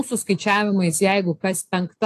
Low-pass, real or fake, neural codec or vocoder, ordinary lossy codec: 14.4 kHz; real; none; AAC, 64 kbps